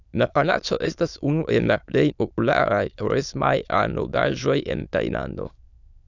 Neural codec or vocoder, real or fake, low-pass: autoencoder, 22.05 kHz, a latent of 192 numbers a frame, VITS, trained on many speakers; fake; 7.2 kHz